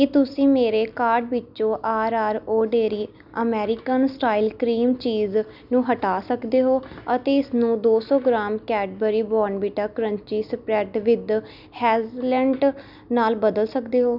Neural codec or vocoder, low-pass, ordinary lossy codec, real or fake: none; 5.4 kHz; none; real